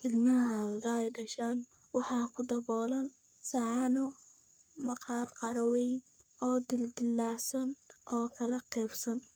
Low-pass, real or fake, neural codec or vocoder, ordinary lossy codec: none; fake; codec, 44.1 kHz, 3.4 kbps, Pupu-Codec; none